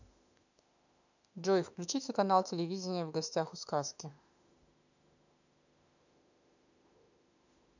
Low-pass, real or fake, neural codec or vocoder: 7.2 kHz; fake; autoencoder, 48 kHz, 32 numbers a frame, DAC-VAE, trained on Japanese speech